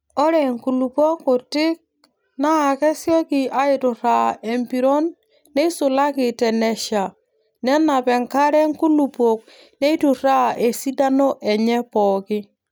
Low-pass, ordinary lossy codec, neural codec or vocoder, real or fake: none; none; none; real